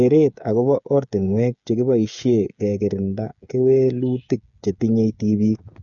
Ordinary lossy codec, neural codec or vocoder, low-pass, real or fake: none; codec, 16 kHz, 8 kbps, FreqCodec, smaller model; 7.2 kHz; fake